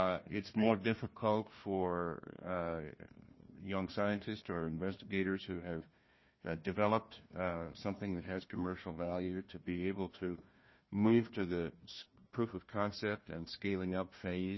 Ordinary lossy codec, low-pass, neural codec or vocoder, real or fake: MP3, 24 kbps; 7.2 kHz; codec, 16 kHz, 1 kbps, FunCodec, trained on Chinese and English, 50 frames a second; fake